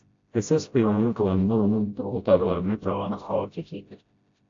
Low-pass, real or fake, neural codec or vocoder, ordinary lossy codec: 7.2 kHz; fake; codec, 16 kHz, 0.5 kbps, FreqCodec, smaller model; AAC, 48 kbps